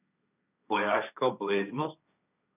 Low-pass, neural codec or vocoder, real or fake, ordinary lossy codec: 3.6 kHz; codec, 16 kHz, 1.1 kbps, Voila-Tokenizer; fake; none